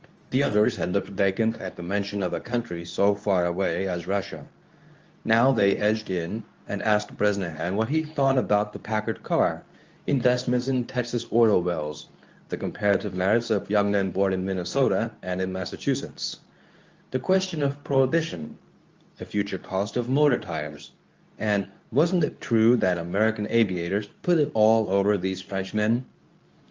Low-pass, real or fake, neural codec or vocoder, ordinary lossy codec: 7.2 kHz; fake; codec, 24 kHz, 0.9 kbps, WavTokenizer, medium speech release version 2; Opus, 24 kbps